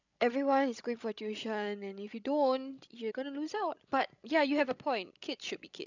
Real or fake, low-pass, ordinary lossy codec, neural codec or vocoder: fake; 7.2 kHz; none; codec, 16 kHz, 16 kbps, FreqCodec, larger model